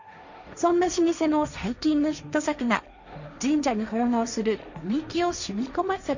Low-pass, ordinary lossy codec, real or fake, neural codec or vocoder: 7.2 kHz; none; fake; codec, 16 kHz, 1.1 kbps, Voila-Tokenizer